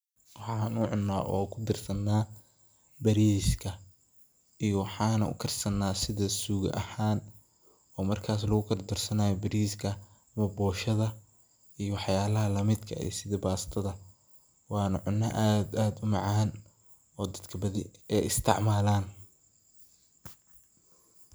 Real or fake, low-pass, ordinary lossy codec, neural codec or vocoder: real; none; none; none